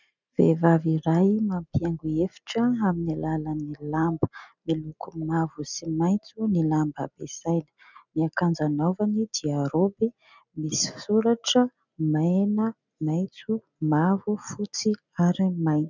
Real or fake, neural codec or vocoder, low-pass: real; none; 7.2 kHz